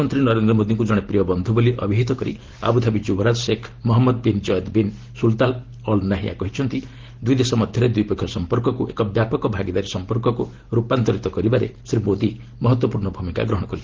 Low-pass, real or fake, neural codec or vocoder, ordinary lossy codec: 7.2 kHz; real; none; Opus, 16 kbps